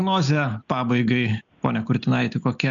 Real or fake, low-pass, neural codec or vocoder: real; 7.2 kHz; none